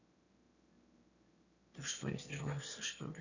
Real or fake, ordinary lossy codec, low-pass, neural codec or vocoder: fake; none; 7.2 kHz; autoencoder, 22.05 kHz, a latent of 192 numbers a frame, VITS, trained on one speaker